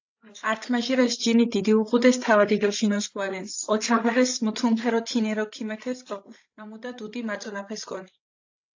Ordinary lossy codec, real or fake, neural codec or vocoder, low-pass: AAC, 48 kbps; fake; codec, 16 kHz, 16 kbps, FreqCodec, larger model; 7.2 kHz